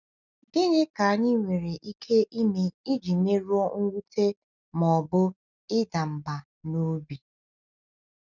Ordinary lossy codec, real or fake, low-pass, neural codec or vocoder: none; real; 7.2 kHz; none